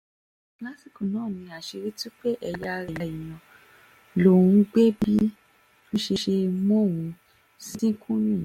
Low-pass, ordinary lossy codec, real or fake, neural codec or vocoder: 19.8 kHz; MP3, 64 kbps; real; none